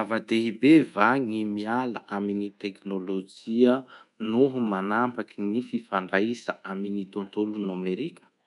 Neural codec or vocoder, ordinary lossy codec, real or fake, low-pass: codec, 24 kHz, 1.2 kbps, DualCodec; none; fake; 10.8 kHz